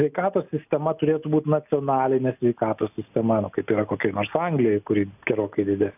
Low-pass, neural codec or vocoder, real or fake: 3.6 kHz; none; real